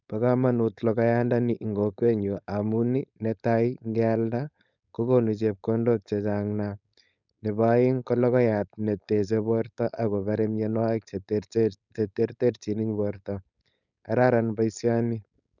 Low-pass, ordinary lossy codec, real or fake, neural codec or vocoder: 7.2 kHz; none; fake; codec, 16 kHz, 4.8 kbps, FACodec